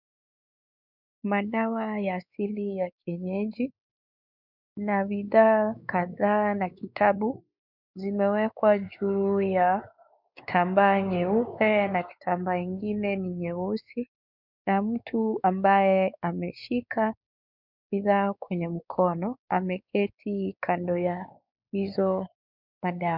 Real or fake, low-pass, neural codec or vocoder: fake; 5.4 kHz; codec, 16 kHz, 4 kbps, X-Codec, WavLM features, trained on Multilingual LibriSpeech